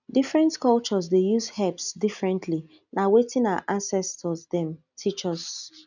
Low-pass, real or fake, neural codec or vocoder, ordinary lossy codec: 7.2 kHz; real; none; none